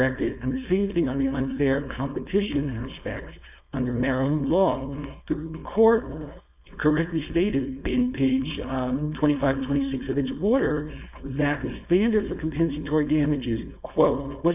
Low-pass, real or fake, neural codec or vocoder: 3.6 kHz; fake; codec, 16 kHz, 4.8 kbps, FACodec